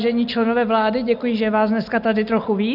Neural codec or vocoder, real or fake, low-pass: none; real; 5.4 kHz